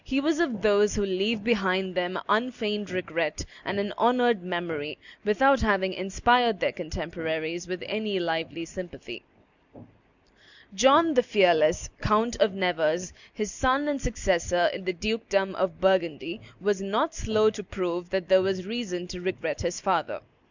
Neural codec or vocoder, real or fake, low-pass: none; real; 7.2 kHz